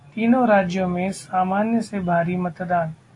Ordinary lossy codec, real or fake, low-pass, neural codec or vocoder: AAC, 48 kbps; real; 10.8 kHz; none